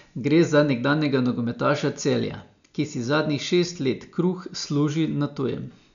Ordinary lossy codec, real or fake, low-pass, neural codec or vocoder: none; real; 7.2 kHz; none